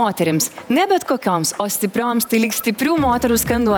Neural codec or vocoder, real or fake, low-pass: vocoder, 48 kHz, 128 mel bands, Vocos; fake; 19.8 kHz